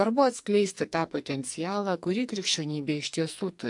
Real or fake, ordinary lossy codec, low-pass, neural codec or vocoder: fake; AAC, 64 kbps; 10.8 kHz; codec, 44.1 kHz, 2.6 kbps, SNAC